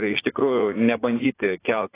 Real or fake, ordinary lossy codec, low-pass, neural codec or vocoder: fake; AAC, 16 kbps; 3.6 kHz; vocoder, 44.1 kHz, 80 mel bands, Vocos